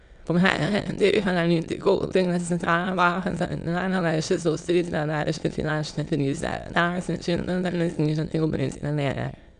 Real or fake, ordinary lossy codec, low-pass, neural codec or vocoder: fake; none; 9.9 kHz; autoencoder, 22.05 kHz, a latent of 192 numbers a frame, VITS, trained on many speakers